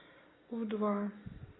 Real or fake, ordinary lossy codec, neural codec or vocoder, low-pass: real; AAC, 16 kbps; none; 7.2 kHz